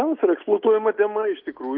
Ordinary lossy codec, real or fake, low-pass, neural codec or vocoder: Opus, 24 kbps; real; 5.4 kHz; none